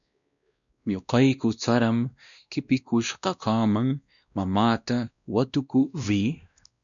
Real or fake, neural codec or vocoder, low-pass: fake; codec, 16 kHz, 1 kbps, X-Codec, WavLM features, trained on Multilingual LibriSpeech; 7.2 kHz